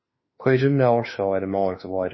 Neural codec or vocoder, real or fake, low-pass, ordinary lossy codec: autoencoder, 48 kHz, 32 numbers a frame, DAC-VAE, trained on Japanese speech; fake; 7.2 kHz; MP3, 24 kbps